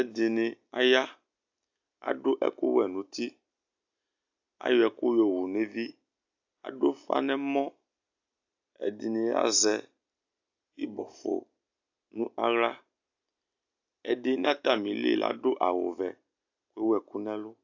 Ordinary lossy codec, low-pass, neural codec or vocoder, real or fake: AAC, 48 kbps; 7.2 kHz; none; real